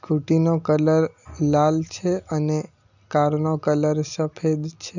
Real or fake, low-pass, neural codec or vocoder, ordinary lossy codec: real; 7.2 kHz; none; none